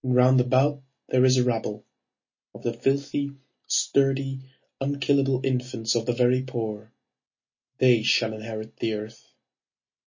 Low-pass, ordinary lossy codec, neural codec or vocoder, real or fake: 7.2 kHz; MP3, 32 kbps; none; real